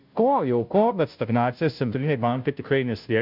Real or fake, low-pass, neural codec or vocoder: fake; 5.4 kHz; codec, 16 kHz, 0.5 kbps, FunCodec, trained on Chinese and English, 25 frames a second